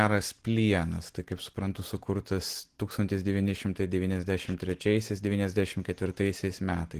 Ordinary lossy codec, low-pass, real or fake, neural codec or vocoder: Opus, 16 kbps; 14.4 kHz; real; none